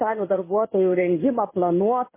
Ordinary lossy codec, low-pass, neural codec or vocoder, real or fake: MP3, 16 kbps; 3.6 kHz; codec, 16 kHz in and 24 kHz out, 1 kbps, XY-Tokenizer; fake